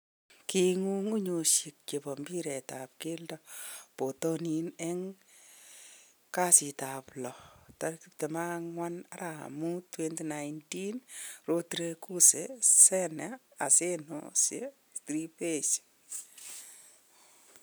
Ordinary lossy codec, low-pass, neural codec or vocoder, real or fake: none; none; none; real